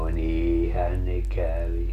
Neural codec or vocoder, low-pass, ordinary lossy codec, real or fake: none; 14.4 kHz; none; real